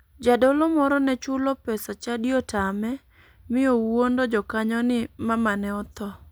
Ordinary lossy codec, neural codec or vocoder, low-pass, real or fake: none; none; none; real